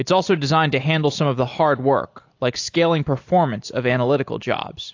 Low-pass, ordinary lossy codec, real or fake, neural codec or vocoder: 7.2 kHz; AAC, 48 kbps; real; none